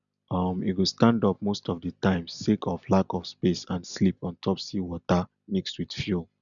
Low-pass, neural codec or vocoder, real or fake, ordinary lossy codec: 7.2 kHz; none; real; none